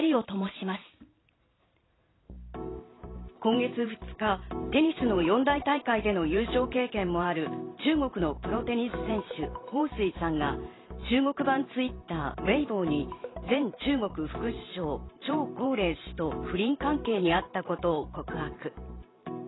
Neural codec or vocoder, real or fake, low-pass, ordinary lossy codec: vocoder, 44.1 kHz, 128 mel bands every 512 samples, BigVGAN v2; fake; 7.2 kHz; AAC, 16 kbps